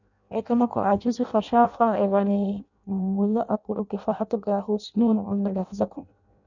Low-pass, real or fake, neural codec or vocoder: 7.2 kHz; fake; codec, 16 kHz in and 24 kHz out, 0.6 kbps, FireRedTTS-2 codec